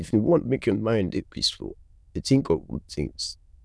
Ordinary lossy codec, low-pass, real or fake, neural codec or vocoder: none; none; fake; autoencoder, 22.05 kHz, a latent of 192 numbers a frame, VITS, trained on many speakers